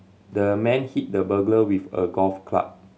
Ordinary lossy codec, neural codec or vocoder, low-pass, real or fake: none; none; none; real